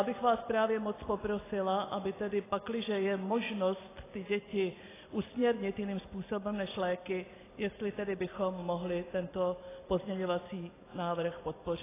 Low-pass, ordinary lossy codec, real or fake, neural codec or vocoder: 3.6 kHz; AAC, 16 kbps; real; none